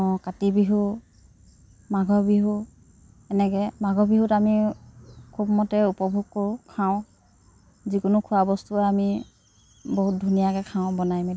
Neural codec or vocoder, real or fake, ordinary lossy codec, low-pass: none; real; none; none